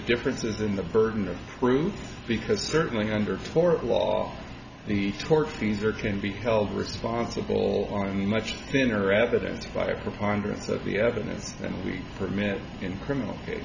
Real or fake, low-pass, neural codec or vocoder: real; 7.2 kHz; none